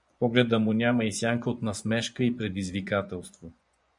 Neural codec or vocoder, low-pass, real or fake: vocoder, 24 kHz, 100 mel bands, Vocos; 10.8 kHz; fake